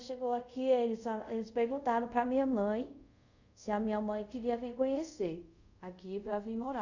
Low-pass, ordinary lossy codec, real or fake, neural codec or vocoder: 7.2 kHz; none; fake; codec, 24 kHz, 0.5 kbps, DualCodec